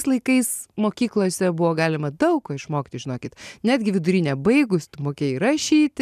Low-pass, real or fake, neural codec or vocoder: 14.4 kHz; real; none